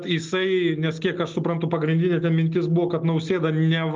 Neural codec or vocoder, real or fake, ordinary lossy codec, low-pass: none; real; Opus, 32 kbps; 7.2 kHz